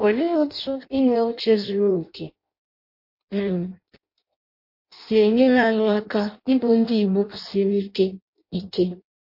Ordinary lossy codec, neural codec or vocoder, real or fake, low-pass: MP3, 32 kbps; codec, 16 kHz in and 24 kHz out, 0.6 kbps, FireRedTTS-2 codec; fake; 5.4 kHz